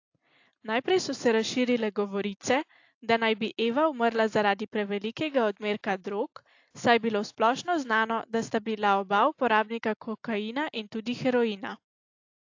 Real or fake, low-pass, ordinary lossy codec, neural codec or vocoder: real; 7.2 kHz; AAC, 48 kbps; none